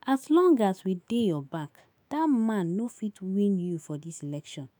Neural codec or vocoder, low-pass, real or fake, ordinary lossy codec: autoencoder, 48 kHz, 128 numbers a frame, DAC-VAE, trained on Japanese speech; none; fake; none